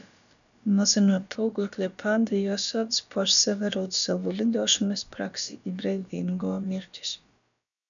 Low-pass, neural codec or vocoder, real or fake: 7.2 kHz; codec, 16 kHz, about 1 kbps, DyCAST, with the encoder's durations; fake